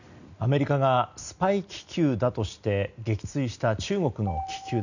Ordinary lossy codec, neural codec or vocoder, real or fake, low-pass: none; none; real; 7.2 kHz